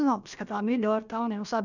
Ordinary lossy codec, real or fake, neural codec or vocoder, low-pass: none; fake; codec, 16 kHz, about 1 kbps, DyCAST, with the encoder's durations; 7.2 kHz